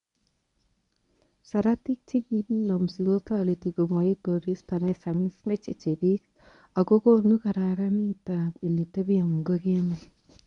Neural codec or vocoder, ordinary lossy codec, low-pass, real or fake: codec, 24 kHz, 0.9 kbps, WavTokenizer, medium speech release version 1; none; 10.8 kHz; fake